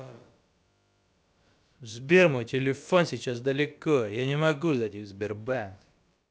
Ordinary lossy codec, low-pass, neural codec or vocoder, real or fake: none; none; codec, 16 kHz, about 1 kbps, DyCAST, with the encoder's durations; fake